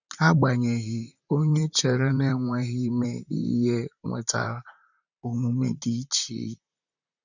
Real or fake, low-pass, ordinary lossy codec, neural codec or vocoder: fake; 7.2 kHz; none; vocoder, 44.1 kHz, 128 mel bands, Pupu-Vocoder